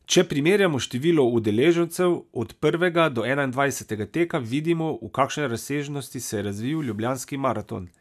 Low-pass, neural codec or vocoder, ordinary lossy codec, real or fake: 14.4 kHz; none; none; real